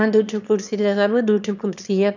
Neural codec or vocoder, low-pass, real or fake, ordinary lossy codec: autoencoder, 22.05 kHz, a latent of 192 numbers a frame, VITS, trained on one speaker; 7.2 kHz; fake; none